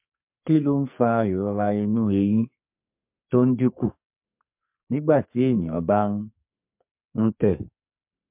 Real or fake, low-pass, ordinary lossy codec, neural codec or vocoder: fake; 3.6 kHz; MP3, 32 kbps; codec, 44.1 kHz, 2.6 kbps, SNAC